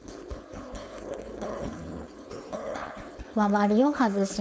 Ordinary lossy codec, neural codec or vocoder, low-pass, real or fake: none; codec, 16 kHz, 4.8 kbps, FACodec; none; fake